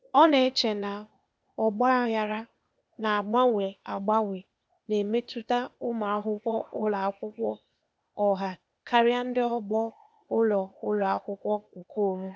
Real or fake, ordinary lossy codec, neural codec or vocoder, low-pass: fake; none; codec, 16 kHz, 0.8 kbps, ZipCodec; none